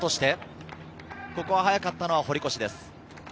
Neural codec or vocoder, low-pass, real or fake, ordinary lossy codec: none; none; real; none